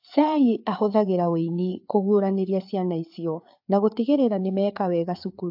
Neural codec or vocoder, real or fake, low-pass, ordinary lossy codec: codec, 16 kHz, 4 kbps, FreqCodec, larger model; fake; 5.4 kHz; AAC, 48 kbps